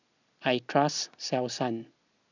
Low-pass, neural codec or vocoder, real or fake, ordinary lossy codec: 7.2 kHz; none; real; none